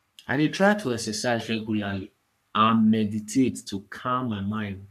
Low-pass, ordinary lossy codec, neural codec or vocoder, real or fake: 14.4 kHz; none; codec, 44.1 kHz, 3.4 kbps, Pupu-Codec; fake